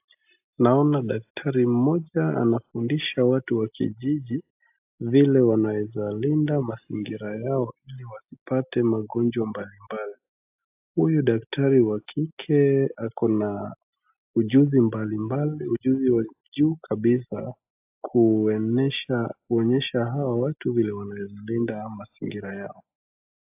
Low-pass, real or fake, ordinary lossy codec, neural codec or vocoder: 3.6 kHz; real; AAC, 32 kbps; none